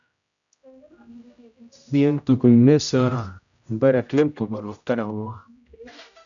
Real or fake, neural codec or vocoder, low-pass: fake; codec, 16 kHz, 0.5 kbps, X-Codec, HuBERT features, trained on general audio; 7.2 kHz